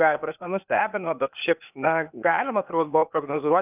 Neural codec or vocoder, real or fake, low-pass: codec, 16 kHz, 0.8 kbps, ZipCodec; fake; 3.6 kHz